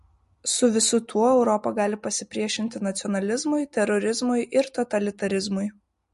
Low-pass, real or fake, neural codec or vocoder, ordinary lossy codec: 14.4 kHz; real; none; MP3, 48 kbps